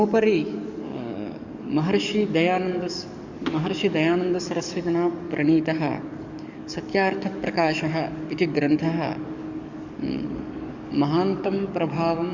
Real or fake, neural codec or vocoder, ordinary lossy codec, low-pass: fake; codec, 44.1 kHz, 7.8 kbps, DAC; Opus, 64 kbps; 7.2 kHz